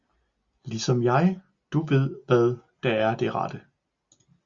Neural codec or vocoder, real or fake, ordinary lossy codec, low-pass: none; real; Opus, 64 kbps; 7.2 kHz